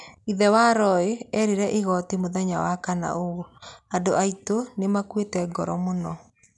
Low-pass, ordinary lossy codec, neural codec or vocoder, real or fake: 10.8 kHz; none; none; real